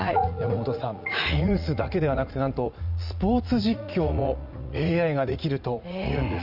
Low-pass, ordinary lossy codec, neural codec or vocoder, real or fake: 5.4 kHz; none; vocoder, 44.1 kHz, 80 mel bands, Vocos; fake